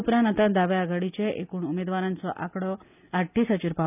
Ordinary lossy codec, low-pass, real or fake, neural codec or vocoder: none; 3.6 kHz; real; none